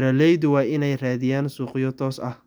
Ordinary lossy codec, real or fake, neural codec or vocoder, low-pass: none; real; none; none